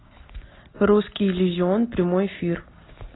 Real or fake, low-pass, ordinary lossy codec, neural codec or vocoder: real; 7.2 kHz; AAC, 16 kbps; none